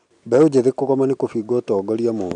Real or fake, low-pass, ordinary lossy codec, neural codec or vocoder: real; 9.9 kHz; none; none